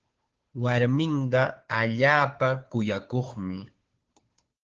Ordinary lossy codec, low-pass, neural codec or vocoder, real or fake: Opus, 16 kbps; 7.2 kHz; codec, 16 kHz, 2 kbps, FunCodec, trained on Chinese and English, 25 frames a second; fake